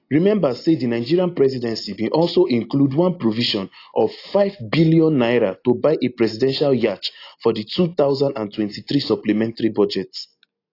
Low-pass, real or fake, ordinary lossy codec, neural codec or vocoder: 5.4 kHz; real; AAC, 32 kbps; none